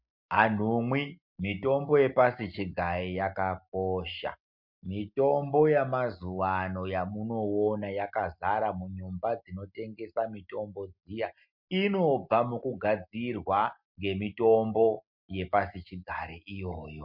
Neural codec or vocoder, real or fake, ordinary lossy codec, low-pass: none; real; MP3, 48 kbps; 5.4 kHz